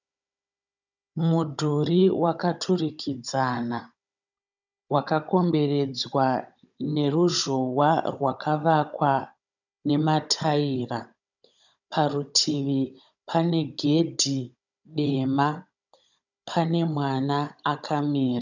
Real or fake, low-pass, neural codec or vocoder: fake; 7.2 kHz; codec, 16 kHz, 16 kbps, FunCodec, trained on Chinese and English, 50 frames a second